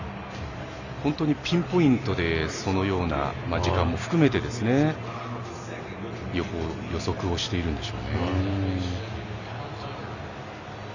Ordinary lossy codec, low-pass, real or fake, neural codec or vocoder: none; 7.2 kHz; real; none